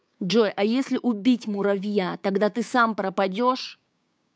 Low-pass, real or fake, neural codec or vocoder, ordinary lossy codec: none; fake; codec, 16 kHz, 6 kbps, DAC; none